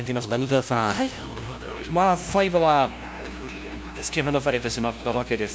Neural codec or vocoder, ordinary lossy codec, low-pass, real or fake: codec, 16 kHz, 0.5 kbps, FunCodec, trained on LibriTTS, 25 frames a second; none; none; fake